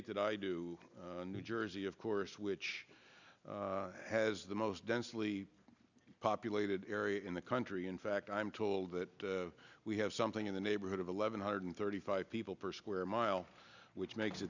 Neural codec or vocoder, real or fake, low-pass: none; real; 7.2 kHz